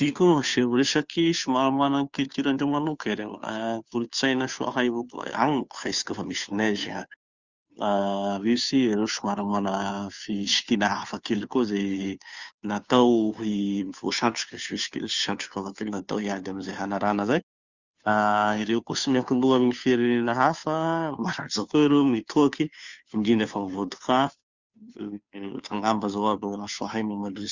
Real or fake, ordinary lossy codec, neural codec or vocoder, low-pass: fake; Opus, 64 kbps; codec, 16 kHz, 2 kbps, FunCodec, trained on Chinese and English, 25 frames a second; 7.2 kHz